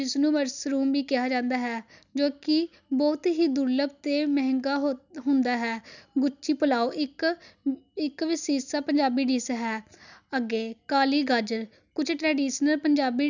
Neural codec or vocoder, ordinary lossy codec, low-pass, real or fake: none; none; 7.2 kHz; real